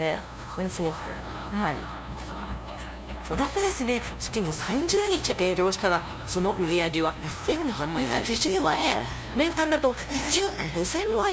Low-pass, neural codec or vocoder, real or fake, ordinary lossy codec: none; codec, 16 kHz, 0.5 kbps, FunCodec, trained on LibriTTS, 25 frames a second; fake; none